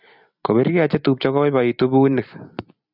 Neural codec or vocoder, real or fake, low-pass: vocoder, 44.1 kHz, 128 mel bands every 256 samples, BigVGAN v2; fake; 5.4 kHz